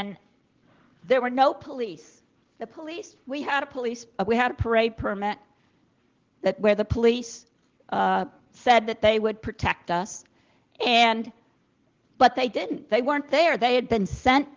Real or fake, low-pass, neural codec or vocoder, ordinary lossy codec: real; 7.2 kHz; none; Opus, 16 kbps